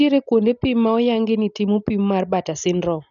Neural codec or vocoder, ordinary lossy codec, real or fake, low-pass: none; none; real; 7.2 kHz